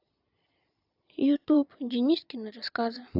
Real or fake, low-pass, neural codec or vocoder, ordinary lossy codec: fake; 5.4 kHz; vocoder, 22.05 kHz, 80 mel bands, Vocos; AAC, 48 kbps